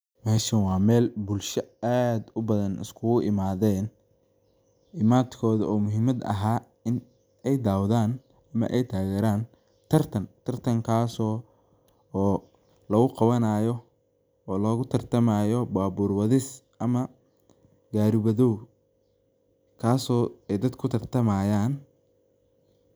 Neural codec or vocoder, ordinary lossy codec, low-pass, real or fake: none; none; none; real